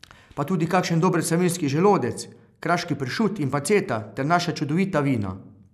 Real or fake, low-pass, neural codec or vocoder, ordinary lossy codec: real; 14.4 kHz; none; none